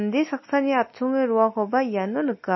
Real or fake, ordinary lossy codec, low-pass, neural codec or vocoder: real; MP3, 24 kbps; 7.2 kHz; none